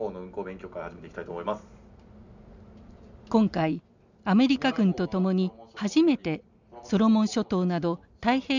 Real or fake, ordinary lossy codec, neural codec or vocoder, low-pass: real; none; none; 7.2 kHz